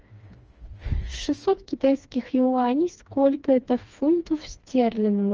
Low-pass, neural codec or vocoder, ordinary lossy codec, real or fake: 7.2 kHz; codec, 16 kHz, 2 kbps, FreqCodec, smaller model; Opus, 24 kbps; fake